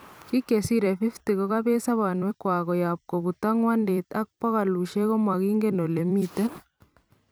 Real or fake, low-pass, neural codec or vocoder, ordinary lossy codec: fake; none; vocoder, 44.1 kHz, 128 mel bands every 256 samples, BigVGAN v2; none